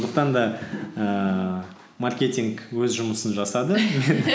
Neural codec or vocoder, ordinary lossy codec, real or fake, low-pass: none; none; real; none